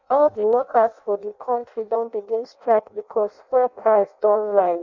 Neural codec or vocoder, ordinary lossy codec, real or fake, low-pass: codec, 16 kHz in and 24 kHz out, 0.6 kbps, FireRedTTS-2 codec; none; fake; 7.2 kHz